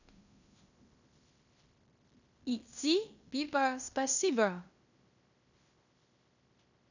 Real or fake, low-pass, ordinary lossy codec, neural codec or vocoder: fake; 7.2 kHz; none; codec, 16 kHz in and 24 kHz out, 0.9 kbps, LongCat-Audio-Codec, fine tuned four codebook decoder